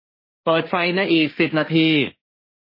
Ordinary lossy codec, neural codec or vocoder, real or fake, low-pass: MP3, 24 kbps; codec, 16 kHz, 1.1 kbps, Voila-Tokenizer; fake; 5.4 kHz